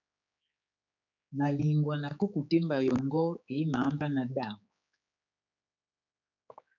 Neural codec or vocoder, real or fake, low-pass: codec, 16 kHz, 4 kbps, X-Codec, HuBERT features, trained on general audio; fake; 7.2 kHz